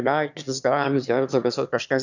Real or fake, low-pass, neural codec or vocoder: fake; 7.2 kHz; autoencoder, 22.05 kHz, a latent of 192 numbers a frame, VITS, trained on one speaker